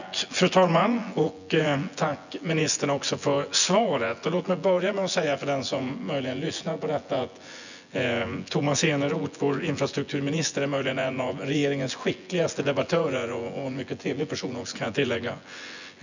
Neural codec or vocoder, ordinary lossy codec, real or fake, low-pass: vocoder, 24 kHz, 100 mel bands, Vocos; none; fake; 7.2 kHz